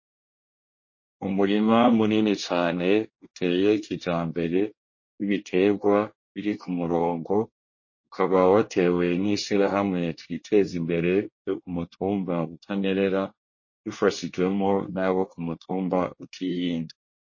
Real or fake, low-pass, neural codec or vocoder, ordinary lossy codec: fake; 7.2 kHz; codec, 24 kHz, 1 kbps, SNAC; MP3, 32 kbps